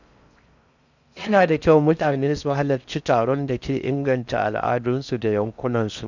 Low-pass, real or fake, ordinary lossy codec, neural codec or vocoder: 7.2 kHz; fake; none; codec, 16 kHz in and 24 kHz out, 0.6 kbps, FocalCodec, streaming, 4096 codes